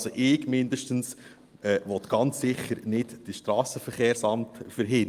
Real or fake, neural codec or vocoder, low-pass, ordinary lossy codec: real; none; 14.4 kHz; Opus, 32 kbps